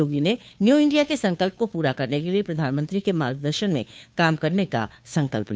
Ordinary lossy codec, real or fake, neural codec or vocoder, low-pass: none; fake; codec, 16 kHz, 2 kbps, FunCodec, trained on Chinese and English, 25 frames a second; none